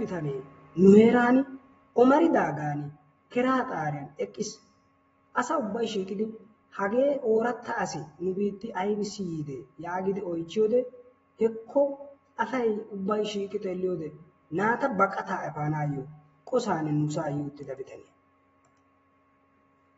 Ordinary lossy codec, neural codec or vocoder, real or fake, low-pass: AAC, 24 kbps; none; real; 19.8 kHz